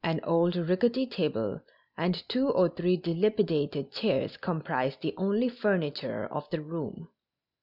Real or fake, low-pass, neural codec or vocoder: real; 5.4 kHz; none